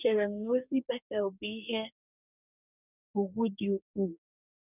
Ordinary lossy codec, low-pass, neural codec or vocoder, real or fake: none; 3.6 kHz; codec, 44.1 kHz, 2.6 kbps, DAC; fake